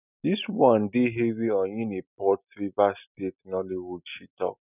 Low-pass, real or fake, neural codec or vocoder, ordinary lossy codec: 3.6 kHz; real; none; none